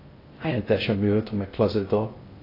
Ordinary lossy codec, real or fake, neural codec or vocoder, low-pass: AAC, 24 kbps; fake; codec, 16 kHz in and 24 kHz out, 0.6 kbps, FocalCodec, streaming, 2048 codes; 5.4 kHz